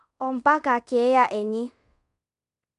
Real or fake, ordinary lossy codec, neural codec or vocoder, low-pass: fake; none; codec, 24 kHz, 0.5 kbps, DualCodec; 10.8 kHz